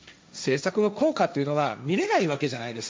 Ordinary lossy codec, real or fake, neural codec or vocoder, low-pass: none; fake; codec, 16 kHz, 1.1 kbps, Voila-Tokenizer; none